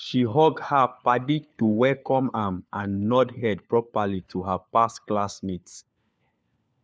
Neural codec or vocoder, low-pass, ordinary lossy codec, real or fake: codec, 16 kHz, 4 kbps, FunCodec, trained on LibriTTS, 50 frames a second; none; none; fake